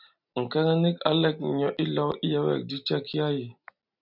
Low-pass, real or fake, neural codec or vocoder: 5.4 kHz; real; none